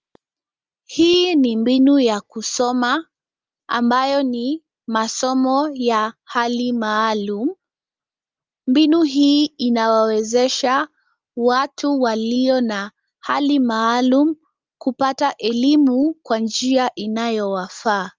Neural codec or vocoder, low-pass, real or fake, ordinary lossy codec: none; 7.2 kHz; real; Opus, 24 kbps